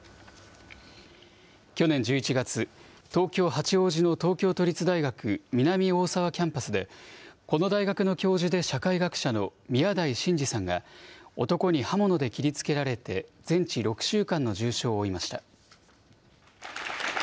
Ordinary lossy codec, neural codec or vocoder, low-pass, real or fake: none; none; none; real